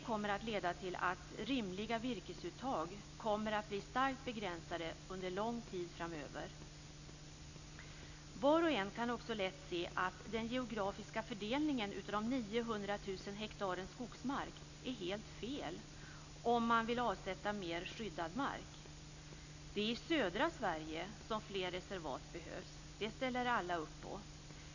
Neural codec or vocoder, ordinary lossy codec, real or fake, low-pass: none; none; real; 7.2 kHz